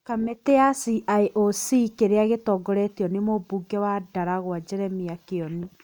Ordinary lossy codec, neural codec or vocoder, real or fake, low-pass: none; none; real; 19.8 kHz